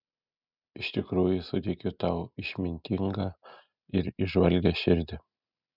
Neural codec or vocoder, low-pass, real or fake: none; 5.4 kHz; real